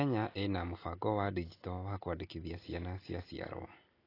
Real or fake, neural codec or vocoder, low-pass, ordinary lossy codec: real; none; 5.4 kHz; AAC, 24 kbps